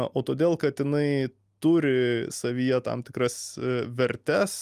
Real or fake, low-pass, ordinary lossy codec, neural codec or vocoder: real; 14.4 kHz; Opus, 32 kbps; none